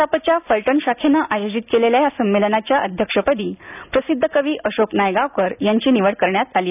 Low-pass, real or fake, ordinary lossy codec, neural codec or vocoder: 3.6 kHz; real; none; none